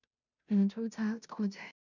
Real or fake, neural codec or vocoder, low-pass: fake; codec, 16 kHz, 0.5 kbps, FunCodec, trained on Chinese and English, 25 frames a second; 7.2 kHz